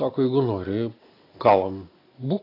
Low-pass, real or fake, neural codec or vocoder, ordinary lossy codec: 5.4 kHz; real; none; AAC, 24 kbps